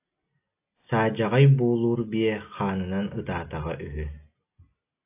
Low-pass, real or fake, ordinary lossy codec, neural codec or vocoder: 3.6 kHz; real; AAC, 24 kbps; none